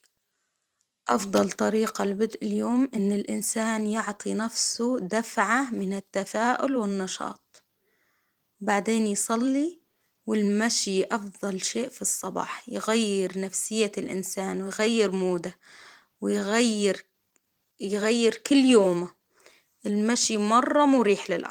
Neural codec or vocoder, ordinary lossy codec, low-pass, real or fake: vocoder, 44.1 kHz, 128 mel bands every 512 samples, BigVGAN v2; Opus, 24 kbps; 19.8 kHz; fake